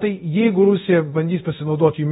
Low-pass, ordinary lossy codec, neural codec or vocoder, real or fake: 10.8 kHz; AAC, 16 kbps; codec, 24 kHz, 0.5 kbps, DualCodec; fake